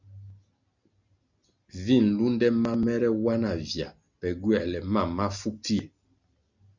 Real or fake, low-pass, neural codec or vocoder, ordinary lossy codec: real; 7.2 kHz; none; Opus, 64 kbps